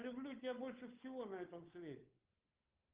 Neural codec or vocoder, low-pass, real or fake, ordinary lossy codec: codec, 16 kHz, 8 kbps, FunCodec, trained on Chinese and English, 25 frames a second; 3.6 kHz; fake; Opus, 32 kbps